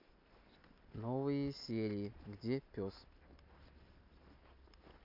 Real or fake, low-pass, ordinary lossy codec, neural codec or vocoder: real; 5.4 kHz; MP3, 48 kbps; none